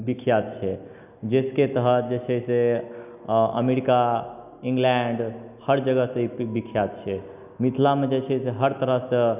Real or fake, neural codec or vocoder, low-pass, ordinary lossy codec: real; none; 3.6 kHz; none